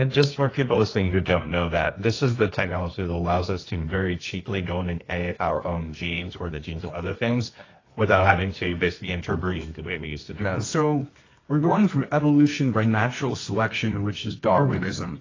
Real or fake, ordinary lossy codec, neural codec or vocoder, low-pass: fake; AAC, 32 kbps; codec, 24 kHz, 0.9 kbps, WavTokenizer, medium music audio release; 7.2 kHz